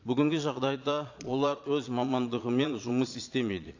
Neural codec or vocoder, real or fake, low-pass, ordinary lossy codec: vocoder, 22.05 kHz, 80 mel bands, Vocos; fake; 7.2 kHz; AAC, 48 kbps